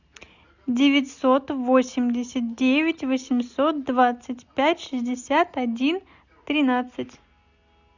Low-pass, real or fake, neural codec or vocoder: 7.2 kHz; real; none